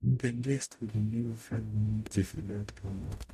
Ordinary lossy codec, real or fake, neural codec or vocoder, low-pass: MP3, 64 kbps; fake; codec, 44.1 kHz, 0.9 kbps, DAC; 14.4 kHz